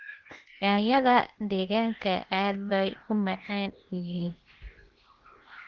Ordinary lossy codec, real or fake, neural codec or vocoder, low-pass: Opus, 16 kbps; fake; codec, 16 kHz, 0.8 kbps, ZipCodec; 7.2 kHz